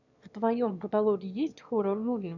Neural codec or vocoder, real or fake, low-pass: autoencoder, 22.05 kHz, a latent of 192 numbers a frame, VITS, trained on one speaker; fake; 7.2 kHz